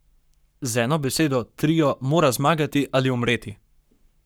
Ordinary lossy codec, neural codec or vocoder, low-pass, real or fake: none; codec, 44.1 kHz, 7.8 kbps, Pupu-Codec; none; fake